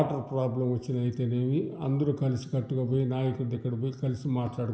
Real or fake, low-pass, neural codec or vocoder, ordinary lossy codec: real; none; none; none